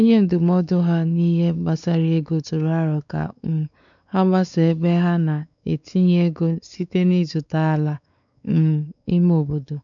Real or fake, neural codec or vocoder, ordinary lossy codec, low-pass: fake; codec, 16 kHz, 4 kbps, X-Codec, WavLM features, trained on Multilingual LibriSpeech; none; 7.2 kHz